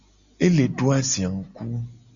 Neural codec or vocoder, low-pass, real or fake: none; 7.2 kHz; real